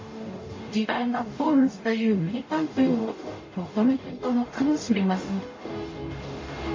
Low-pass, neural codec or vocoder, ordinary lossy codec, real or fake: 7.2 kHz; codec, 44.1 kHz, 0.9 kbps, DAC; MP3, 32 kbps; fake